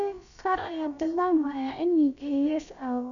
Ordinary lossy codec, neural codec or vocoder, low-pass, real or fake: none; codec, 16 kHz, about 1 kbps, DyCAST, with the encoder's durations; 7.2 kHz; fake